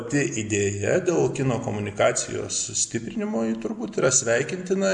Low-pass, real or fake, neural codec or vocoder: 10.8 kHz; real; none